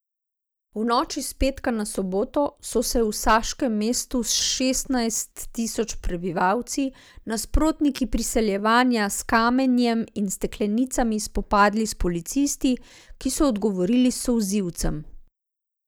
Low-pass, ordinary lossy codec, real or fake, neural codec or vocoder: none; none; real; none